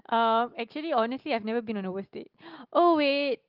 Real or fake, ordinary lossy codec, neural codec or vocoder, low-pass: real; Opus, 32 kbps; none; 5.4 kHz